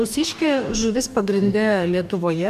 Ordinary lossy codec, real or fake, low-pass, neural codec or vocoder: MP3, 96 kbps; fake; 14.4 kHz; codec, 44.1 kHz, 2.6 kbps, DAC